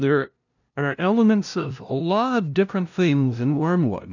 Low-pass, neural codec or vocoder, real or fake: 7.2 kHz; codec, 16 kHz, 0.5 kbps, FunCodec, trained on LibriTTS, 25 frames a second; fake